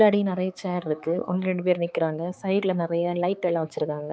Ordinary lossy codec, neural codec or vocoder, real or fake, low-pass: none; codec, 16 kHz, 4 kbps, X-Codec, HuBERT features, trained on balanced general audio; fake; none